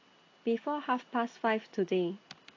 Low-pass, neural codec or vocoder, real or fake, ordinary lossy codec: 7.2 kHz; none; real; AAC, 32 kbps